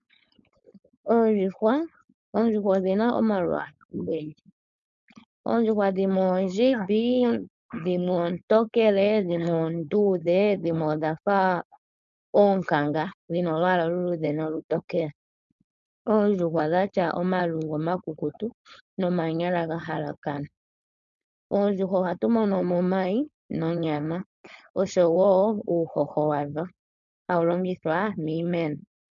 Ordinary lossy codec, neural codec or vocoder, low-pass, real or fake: MP3, 96 kbps; codec, 16 kHz, 4.8 kbps, FACodec; 7.2 kHz; fake